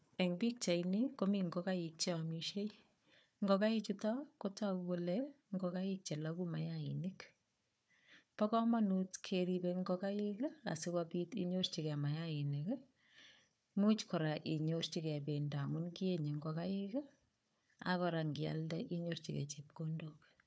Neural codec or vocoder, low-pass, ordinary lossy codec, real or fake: codec, 16 kHz, 4 kbps, FunCodec, trained on Chinese and English, 50 frames a second; none; none; fake